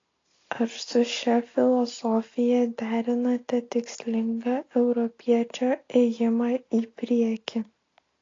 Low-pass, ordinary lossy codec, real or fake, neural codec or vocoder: 7.2 kHz; AAC, 32 kbps; real; none